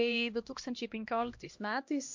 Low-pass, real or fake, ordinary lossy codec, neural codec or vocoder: 7.2 kHz; fake; MP3, 48 kbps; codec, 16 kHz, 1 kbps, X-Codec, HuBERT features, trained on LibriSpeech